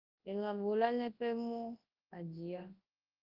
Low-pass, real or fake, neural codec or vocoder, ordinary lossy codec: 5.4 kHz; fake; codec, 24 kHz, 0.9 kbps, WavTokenizer, large speech release; Opus, 32 kbps